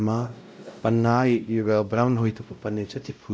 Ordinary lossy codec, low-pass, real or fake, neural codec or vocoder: none; none; fake; codec, 16 kHz, 0.5 kbps, X-Codec, WavLM features, trained on Multilingual LibriSpeech